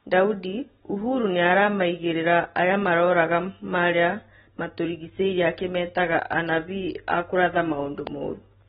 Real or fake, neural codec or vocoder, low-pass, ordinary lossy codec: real; none; 19.8 kHz; AAC, 16 kbps